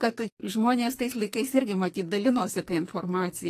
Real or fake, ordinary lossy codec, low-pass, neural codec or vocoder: fake; AAC, 48 kbps; 14.4 kHz; codec, 44.1 kHz, 2.6 kbps, SNAC